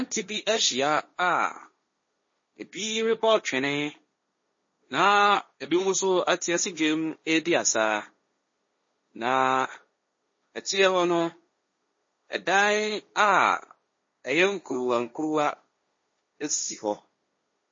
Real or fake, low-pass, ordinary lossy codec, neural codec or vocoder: fake; 7.2 kHz; MP3, 32 kbps; codec, 16 kHz, 1.1 kbps, Voila-Tokenizer